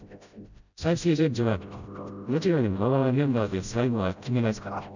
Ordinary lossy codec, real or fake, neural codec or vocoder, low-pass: none; fake; codec, 16 kHz, 0.5 kbps, FreqCodec, smaller model; 7.2 kHz